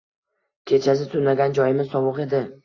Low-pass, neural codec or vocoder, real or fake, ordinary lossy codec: 7.2 kHz; none; real; AAC, 32 kbps